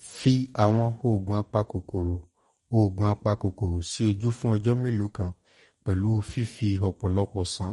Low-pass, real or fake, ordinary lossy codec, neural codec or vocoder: 19.8 kHz; fake; MP3, 48 kbps; codec, 44.1 kHz, 2.6 kbps, DAC